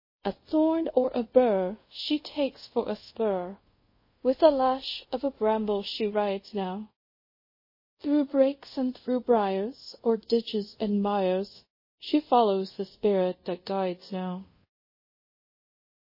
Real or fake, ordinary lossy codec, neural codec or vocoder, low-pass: fake; MP3, 24 kbps; codec, 24 kHz, 0.5 kbps, DualCodec; 5.4 kHz